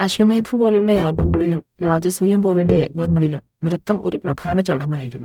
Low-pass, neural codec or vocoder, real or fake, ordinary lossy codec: 19.8 kHz; codec, 44.1 kHz, 0.9 kbps, DAC; fake; none